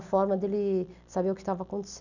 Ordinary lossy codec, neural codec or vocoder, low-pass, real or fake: none; none; 7.2 kHz; real